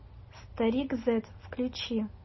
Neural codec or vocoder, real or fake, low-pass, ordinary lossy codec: none; real; 7.2 kHz; MP3, 24 kbps